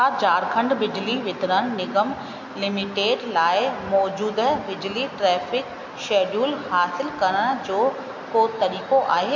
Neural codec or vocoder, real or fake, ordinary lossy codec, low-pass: none; real; MP3, 48 kbps; 7.2 kHz